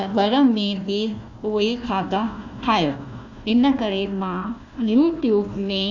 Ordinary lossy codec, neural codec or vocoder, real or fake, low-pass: none; codec, 16 kHz, 1 kbps, FunCodec, trained on Chinese and English, 50 frames a second; fake; 7.2 kHz